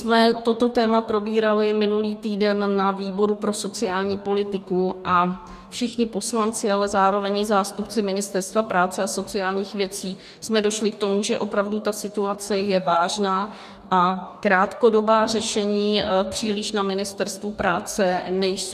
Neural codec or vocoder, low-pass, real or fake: codec, 44.1 kHz, 2.6 kbps, DAC; 14.4 kHz; fake